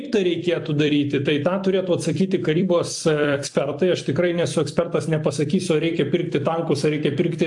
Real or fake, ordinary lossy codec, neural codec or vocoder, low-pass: fake; AAC, 64 kbps; vocoder, 44.1 kHz, 128 mel bands every 256 samples, BigVGAN v2; 10.8 kHz